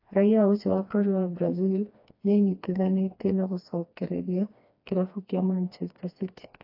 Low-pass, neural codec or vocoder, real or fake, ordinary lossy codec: 5.4 kHz; codec, 16 kHz, 2 kbps, FreqCodec, smaller model; fake; AAC, 32 kbps